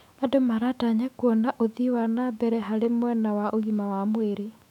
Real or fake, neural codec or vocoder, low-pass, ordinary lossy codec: fake; autoencoder, 48 kHz, 128 numbers a frame, DAC-VAE, trained on Japanese speech; 19.8 kHz; none